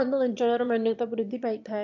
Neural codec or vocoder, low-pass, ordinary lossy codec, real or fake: autoencoder, 22.05 kHz, a latent of 192 numbers a frame, VITS, trained on one speaker; 7.2 kHz; MP3, 48 kbps; fake